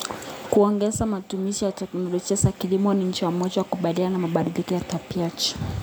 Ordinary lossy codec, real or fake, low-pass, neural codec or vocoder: none; real; none; none